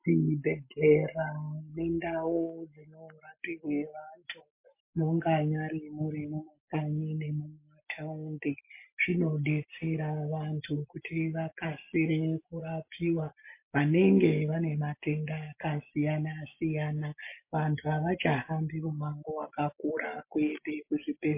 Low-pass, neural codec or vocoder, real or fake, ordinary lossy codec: 3.6 kHz; none; real; MP3, 24 kbps